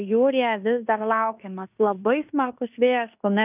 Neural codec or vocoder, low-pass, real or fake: codec, 16 kHz in and 24 kHz out, 0.9 kbps, LongCat-Audio-Codec, fine tuned four codebook decoder; 3.6 kHz; fake